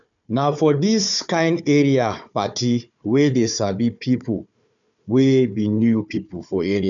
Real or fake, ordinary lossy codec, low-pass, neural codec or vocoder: fake; none; 7.2 kHz; codec, 16 kHz, 4 kbps, FunCodec, trained on Chinese and English, 50 frames a second